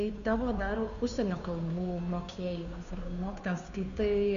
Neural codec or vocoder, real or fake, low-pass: codec, 16 kHz, 2 kbps, FunCodec, trained on Chinese and English, 25 frames a second; fake; 7.2 kHz